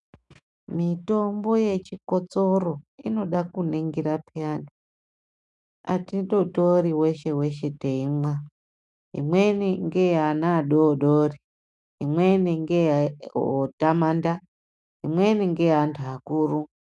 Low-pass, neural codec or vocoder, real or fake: 10.8 kHz; autoencoder, 48 kHz, 128 numbers a frame, DAC-VAE, trained on Japanese speech; fake